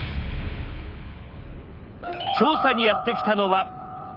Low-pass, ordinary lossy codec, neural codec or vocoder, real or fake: 5.4 kHz; none; codec, 24 kHz, 6 kbps, HILCodec; fake